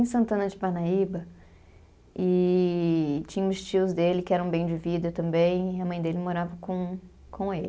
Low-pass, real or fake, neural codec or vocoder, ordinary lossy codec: none; real; none; none